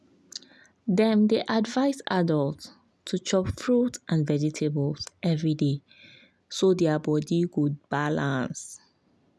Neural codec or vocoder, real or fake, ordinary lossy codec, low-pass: none; real; none; none